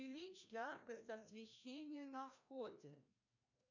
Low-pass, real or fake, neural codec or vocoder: 7.2 kHz; fake; codec, 16 kHz, 1 kbps, FreqCodec, larger model